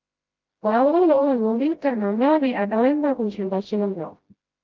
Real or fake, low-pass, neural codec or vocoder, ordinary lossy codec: fake; 7.2 kHz; codec, 16 kHz, 0.5 kbps, FreqCodec, smaller model; Opus, 32 kbps